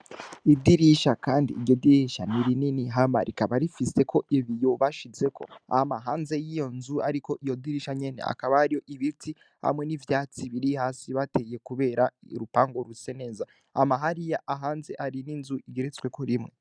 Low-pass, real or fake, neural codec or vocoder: 9.9 kHz; real; none